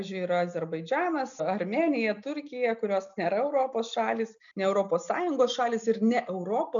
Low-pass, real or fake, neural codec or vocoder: 7.2 kHz; real; none